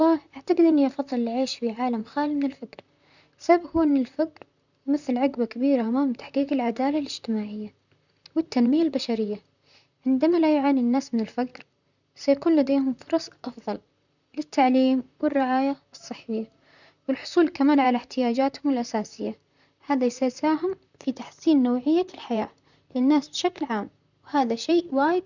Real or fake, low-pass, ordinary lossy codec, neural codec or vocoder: fake; 7.2 kHz; none; vocoder, 44.1 kHz, 128 mel bands, Pupu-Vocoder